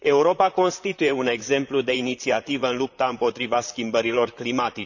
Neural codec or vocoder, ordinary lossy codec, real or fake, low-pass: vocoder, 44.1 kHz, 128 mel bands, Pupu-Vocoder; none; fake; 7.2 kHz